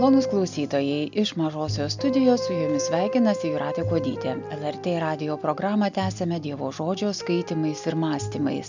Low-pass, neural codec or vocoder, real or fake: 7.2 kHz; none; real